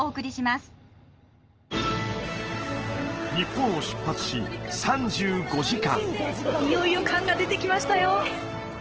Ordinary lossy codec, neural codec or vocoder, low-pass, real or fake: Opus, 16 kbps; none; 7.2 kHz; real